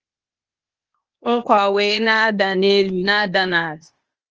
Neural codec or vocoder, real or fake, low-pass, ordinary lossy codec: codec, 16 kHz, 0.8 kbps, ZipCodec; fake; 7.2 kHz; Opus, 24 kbps